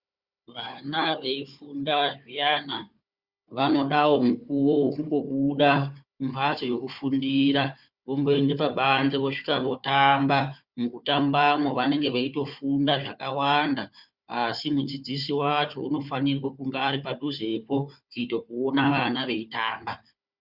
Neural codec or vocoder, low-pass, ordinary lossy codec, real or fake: codec, 16 kHz, 4 kbps, FunCodec, trained on Chinese and English, 50 frames a second; 5.4 kHz; Opus, 64 kbps; fake